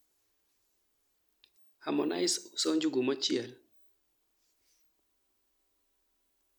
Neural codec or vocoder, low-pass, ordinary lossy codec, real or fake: none; 19.8 kHz; MP3, 96 kbps; real